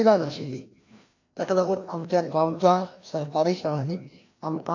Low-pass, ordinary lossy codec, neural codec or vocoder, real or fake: 7.2 kHz; AAC, 48 kbps; codec, 16 kHz, 1 kbps, FreqCodec, larger model; fake